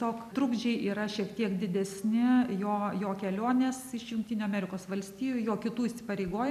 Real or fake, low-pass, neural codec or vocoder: real; 14.4 kHz; none